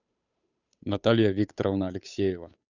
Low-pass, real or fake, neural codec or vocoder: 7.2 kHz; fake; codec, 16 kHz, 8 kbps, FunCodec, trained on Chinese and English, 25 frames a second